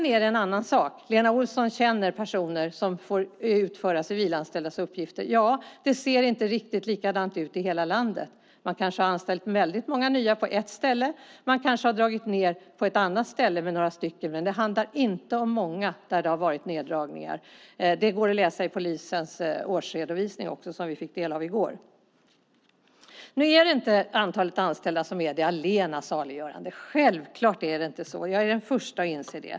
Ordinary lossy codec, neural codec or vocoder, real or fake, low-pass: none; none; real; none